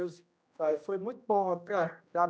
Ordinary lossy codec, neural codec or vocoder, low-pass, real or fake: none; codec, 16 kHz, 1 kbps, X-Codec, HuBERT features, trained on general audio; none; fake